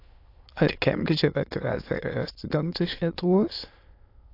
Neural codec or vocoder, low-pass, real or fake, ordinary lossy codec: autoencoder, 22.05 kHz, a latent of 192 numbers a frame, VITS, trained on many speakers; 5.4 kHz; fake; AAC, 32 kbps